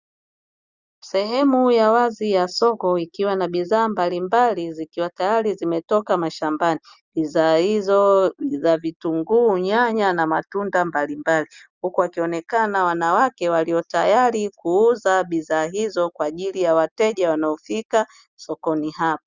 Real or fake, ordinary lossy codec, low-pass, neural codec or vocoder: real; Opus, 64 kbps; 7.2 kHz; none